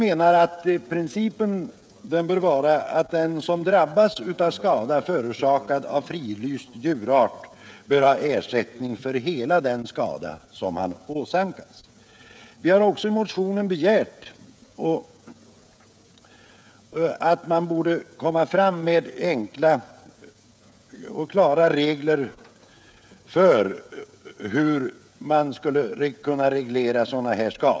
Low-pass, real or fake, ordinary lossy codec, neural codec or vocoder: none; fake; none; codec, 16 kHz, 16 kbps, FreqCodec, smaller model